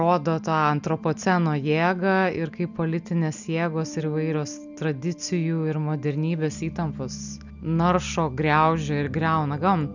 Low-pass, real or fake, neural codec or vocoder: 7.2 kHz; real; none